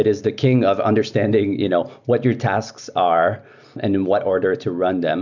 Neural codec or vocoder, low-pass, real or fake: none; 7.2 kHz; real